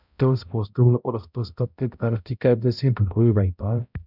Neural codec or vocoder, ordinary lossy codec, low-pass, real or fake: codec, 16 kHz, 0.5 kbps, X-Codec, HuBERT features, trained on balanced general audio; none; 5.4 kHz; fake